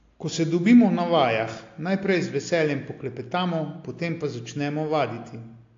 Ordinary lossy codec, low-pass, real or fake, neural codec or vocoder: AAC, 48 kbps; 7.2 kHz; real; none